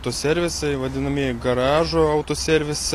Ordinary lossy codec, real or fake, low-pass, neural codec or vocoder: AAC, 48 kbps; real; 14.4 kHz; none